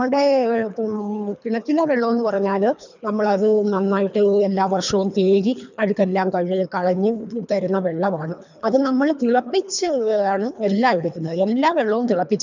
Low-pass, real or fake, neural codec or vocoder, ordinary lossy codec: 7.2 kHz; fake; codec, 24 kHz, 3 kbps, HILCodec; none